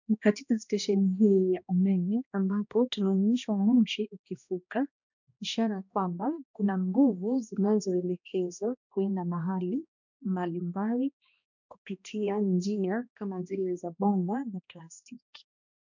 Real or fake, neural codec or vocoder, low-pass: fake; codec, 16 kHz, 1 kbps, X-Codec, HuBERT features, trained on balanced general audio; 7.2 kHz